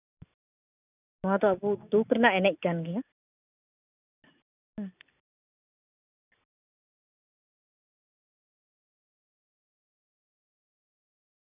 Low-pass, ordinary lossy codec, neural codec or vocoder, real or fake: 3.6 kHz; none; none; real